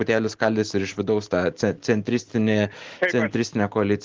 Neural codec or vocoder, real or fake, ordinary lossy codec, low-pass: none; real; Opus, 16 kbps; 7.2 kHz